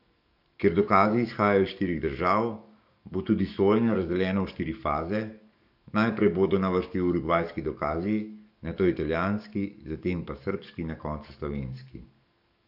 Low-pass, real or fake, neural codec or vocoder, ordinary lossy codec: 5.4 kHz; fake; codec, 44.1 kHz, 7.8 kbps, DAC; none